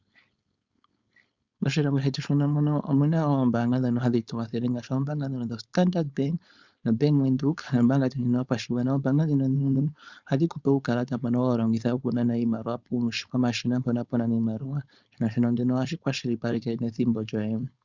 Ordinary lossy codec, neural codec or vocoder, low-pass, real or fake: Opus, 64 kbps; codec, 16 kHz, 4.8 kbps, FACodec; 7.2 kHz; fake